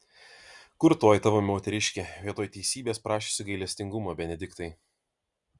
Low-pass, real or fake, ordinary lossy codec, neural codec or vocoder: 10.8 kHz; real; Opus, 64 kbps; none